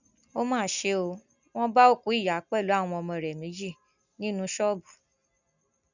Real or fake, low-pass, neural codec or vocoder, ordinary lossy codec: real; 7.2 kHz; none; none